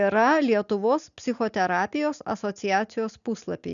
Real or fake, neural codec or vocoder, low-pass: real; none; 7.2 kHz